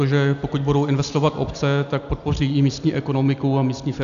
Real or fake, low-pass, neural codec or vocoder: real; 7.2 kHz; none